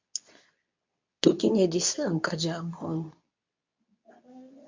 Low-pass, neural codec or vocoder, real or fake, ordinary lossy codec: 7.2 kHz; codec, 24 kHz, 0.9 kbps, WavTokenizer, medium speech release version 2; fake; MP3, 64 kbps